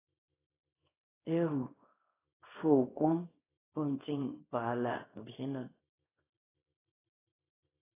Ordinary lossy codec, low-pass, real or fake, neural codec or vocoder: AAC, 16 kbps; 3.6 kHz; fake; codec, 24 kHz, 0.9 kbps, WavTokenizer, small release